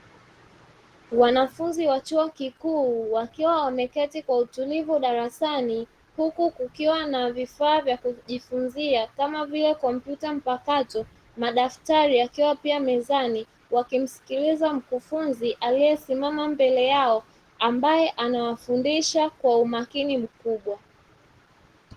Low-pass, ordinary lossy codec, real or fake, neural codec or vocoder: 14.4 kHz; Opus, 16 kbps; real; none